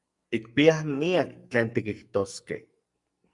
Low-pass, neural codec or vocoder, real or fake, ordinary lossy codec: 10.8 kHz; codec, 44.1 kHz, 2.6 kbps, SNAC; fake; Opus, 64 kbps